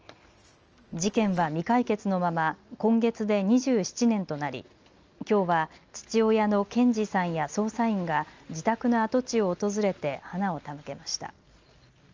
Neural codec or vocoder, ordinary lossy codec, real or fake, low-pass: none; Opus, 24 kbps; real; 7.2 kHz